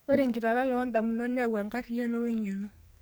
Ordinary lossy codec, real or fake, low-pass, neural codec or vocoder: none; fake; none; codec, 44.1 kHz, 2.6 kbps, SNAC